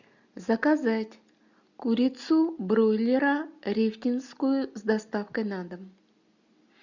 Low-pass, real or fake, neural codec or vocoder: 7.2 kHz; real; none